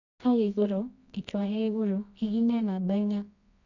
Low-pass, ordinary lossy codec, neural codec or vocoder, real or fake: 7.2 kHz; none; codec, 24 kHz, 0.9 kbps, WavTokenizer, medium music audio release; fake